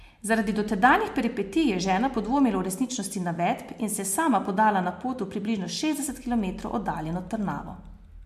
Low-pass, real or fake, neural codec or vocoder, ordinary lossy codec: 14.4 kHz; real; none; MP3, 64 kbps